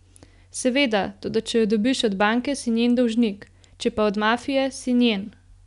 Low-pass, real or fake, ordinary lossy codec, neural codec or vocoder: 10.8 kHz; real; none; none